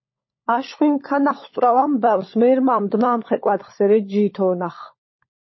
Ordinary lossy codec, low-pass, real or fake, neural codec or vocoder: MP3, 24 kbps; 7.2 kHz; fake; codec, 16 kHz, 16 kbps, FunCodec, trained on LibriTTS, 50 frames a second